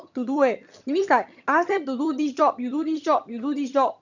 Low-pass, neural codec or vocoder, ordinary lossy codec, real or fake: 7.2 kHz; vocoder, 22.05 kHz, 80 mel bands, HiFi-GAN; none; fake